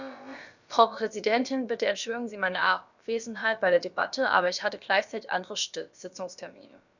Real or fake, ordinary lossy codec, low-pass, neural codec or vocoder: fake; none; 7.2 kHz; codec, 16 kHz, about 1 kbps, DyCAST, with the encoder's durations